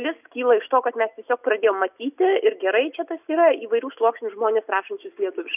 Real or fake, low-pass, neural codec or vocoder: real; 3.6 kHz; none